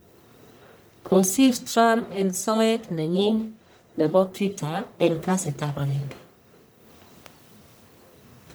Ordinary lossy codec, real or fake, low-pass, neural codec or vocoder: none; fake; none; codec, 44.1 kHz, 1.7 kbps, Pupu-Codec